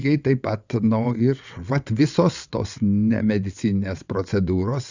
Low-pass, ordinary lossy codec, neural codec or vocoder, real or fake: 7.2 kHz; Opus, 64 kbps; vocoder, 22.05 kHz, 80 mel bands, WaveNeXt; fake